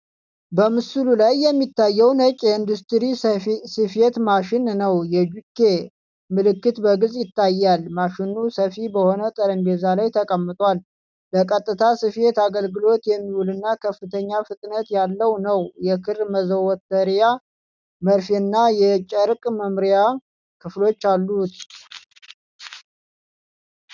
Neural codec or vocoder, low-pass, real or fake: none; 7.2 kHz; real